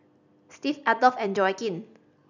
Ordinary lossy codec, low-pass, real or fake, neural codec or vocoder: none; 7.2 kHz; real; none